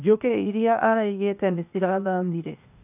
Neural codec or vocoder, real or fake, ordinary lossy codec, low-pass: codec, 16 kHz, 0.8 kbps, ZipCodec; fake; none; 3.6 kHz